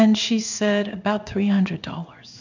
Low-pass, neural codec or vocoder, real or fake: 7.2 kHz; codec, 16 kHz in and 24 kHz out, 1 kbps, XY-Tokenizer; fake